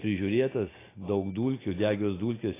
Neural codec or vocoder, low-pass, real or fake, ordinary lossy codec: none; 3.6 kHz; real; AAC, 16 kbps